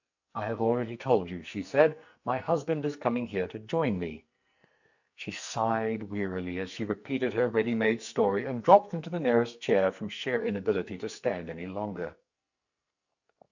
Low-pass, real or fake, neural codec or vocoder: 7.2 kHz; fake; codec, 44.1 kHz, 2.6 kbps, SNAC